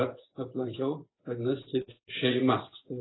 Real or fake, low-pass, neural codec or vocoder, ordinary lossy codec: fake; 7.2 kHz; vocoder, 24 kHz, 100 mel bands, Vocos; AAC, 16 kbps